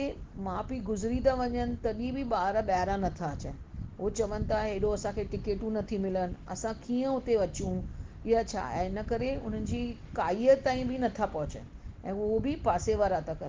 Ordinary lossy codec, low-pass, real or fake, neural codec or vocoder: Opus, 16 kbps; 7.2 kHz; real; none